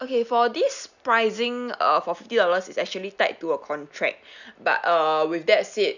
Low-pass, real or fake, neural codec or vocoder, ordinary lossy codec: 7.2 kHz; real; none; none